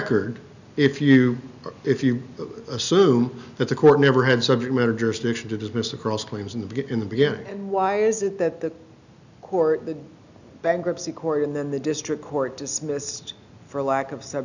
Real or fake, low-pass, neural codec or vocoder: real; 7.2 kHz; none